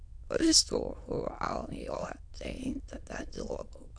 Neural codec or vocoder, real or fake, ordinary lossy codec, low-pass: autoencoder, 22.05 kHz, a latent of 192 numbers a frame, VITS, trained on many speakers; fake; AAC, 64 kbps; 9.9 kHz